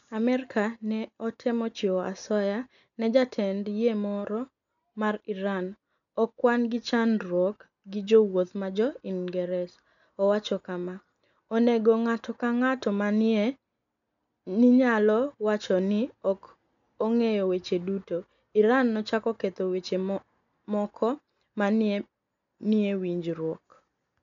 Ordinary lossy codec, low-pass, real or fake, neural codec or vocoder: none; 7.2 kHz; real; none